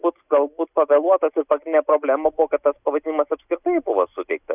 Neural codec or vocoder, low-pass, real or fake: none; 3.6 kHz; real